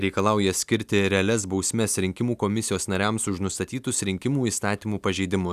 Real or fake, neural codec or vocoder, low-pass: real; none; 14.4 kHz